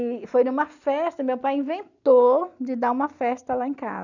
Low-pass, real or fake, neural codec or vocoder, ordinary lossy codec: 7.2 kHz; real; none; none